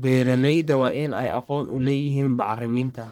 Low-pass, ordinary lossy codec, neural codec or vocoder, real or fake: none; none; codec, 44.1 kHz, 1.7 kbps, Pupu-Codec; fake